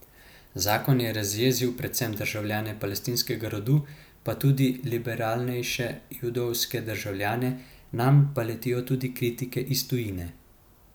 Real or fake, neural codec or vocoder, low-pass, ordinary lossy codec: real; none; none; none